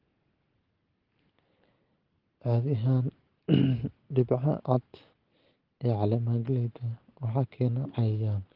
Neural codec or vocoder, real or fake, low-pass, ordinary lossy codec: none; real; 5.4 kHz; Opus, 16 kbps